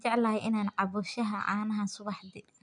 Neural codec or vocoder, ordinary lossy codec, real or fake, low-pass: none; none; real; 9.9 kHz